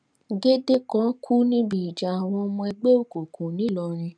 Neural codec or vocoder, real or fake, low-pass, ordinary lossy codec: none; real; 9.9 kHz; none